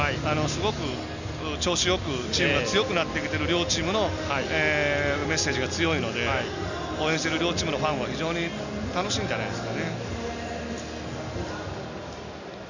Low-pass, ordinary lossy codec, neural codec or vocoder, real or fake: 7.2 kHz; none; none; real